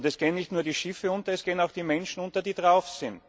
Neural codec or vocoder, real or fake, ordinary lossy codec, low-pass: none; real; none; none